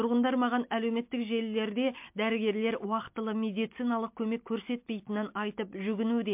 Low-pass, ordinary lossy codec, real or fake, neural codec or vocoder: 3.6 kHz; MP3, 32 kbps; real; none